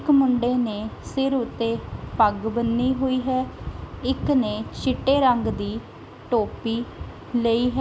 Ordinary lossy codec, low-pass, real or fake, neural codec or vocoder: none; none; real; none